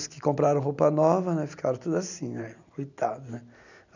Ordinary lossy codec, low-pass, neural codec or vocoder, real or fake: none; 7.2 kHz; none; real